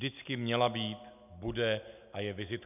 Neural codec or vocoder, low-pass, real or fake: none; 3.6 kHz; real